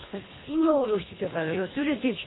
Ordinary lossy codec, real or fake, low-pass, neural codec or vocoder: AAC, 16 kbps; fake; 7.2 kHz; codec, 24 kHz, 1.5 kbps, HILCodec